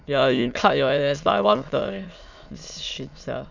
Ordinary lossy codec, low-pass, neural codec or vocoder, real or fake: none; 7.2 kHz; autoencoder, 22.05 kHz, a latent of 192 numbers a frame, VITS, trained on many speakers; fake